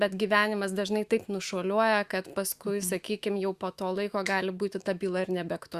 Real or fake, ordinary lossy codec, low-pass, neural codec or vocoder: fake; Opus, 64 kbps; 14.4 kHz; autoencoder, 48 kHz, 128 numbers a frame, DAC-VAE, trained on Japanese speech